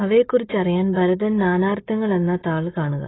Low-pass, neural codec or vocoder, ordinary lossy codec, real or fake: 7.2 kHz; none; AAC, 16 kbps; real